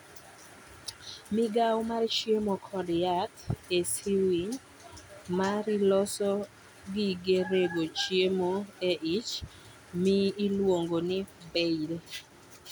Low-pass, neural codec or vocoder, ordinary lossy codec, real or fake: 19.8 kHz; none; none; real